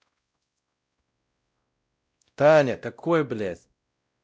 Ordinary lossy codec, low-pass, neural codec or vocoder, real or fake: none; none; codec, 16 kHz, 0.5 kbps, X-Codec, WavLM features, trained on Multilingual LibriSpeech; fake